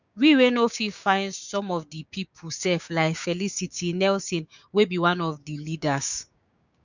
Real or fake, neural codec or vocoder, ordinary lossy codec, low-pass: fake; codec, 16 kHz, 6 kbps, DAC; none; 7.2 kHz